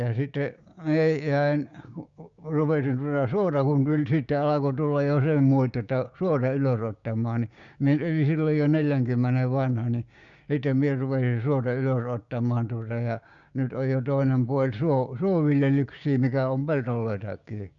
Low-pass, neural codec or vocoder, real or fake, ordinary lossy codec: 7.2 kHz; codec, 16 kHz, 6 kbps, DAC; fake; Opus, 64 kbps